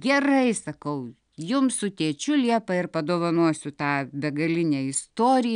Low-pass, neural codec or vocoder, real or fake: 9.9 kHz; none; real